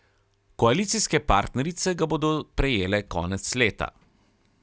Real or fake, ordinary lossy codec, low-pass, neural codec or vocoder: real; none; none; none